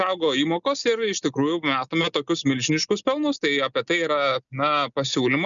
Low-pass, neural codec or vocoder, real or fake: 7.2 kHz; none; real